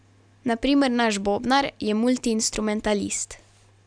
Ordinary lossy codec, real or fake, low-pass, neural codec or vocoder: none; real; 9.9 kHz; none